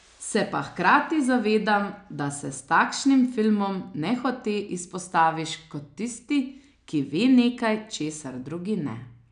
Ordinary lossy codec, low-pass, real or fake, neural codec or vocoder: none; 9.9 kHz; real; none